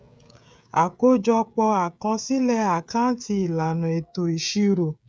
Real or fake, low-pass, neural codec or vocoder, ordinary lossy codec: fake; none; codec, 16 kHz, 16 kbps, FreqCodec, smaller model; none